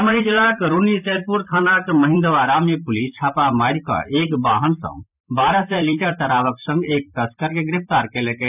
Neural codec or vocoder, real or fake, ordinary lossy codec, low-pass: vocoder, 44.1 kHz, 128 mel bands every 256 samples, BigVGAN v2; fake; none; 3.6 kHz